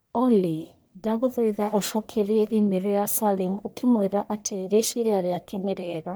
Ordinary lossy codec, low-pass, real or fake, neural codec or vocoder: none; none; fake; codec, 44.1 kHz, 1.7 kbps, Pupu-Codec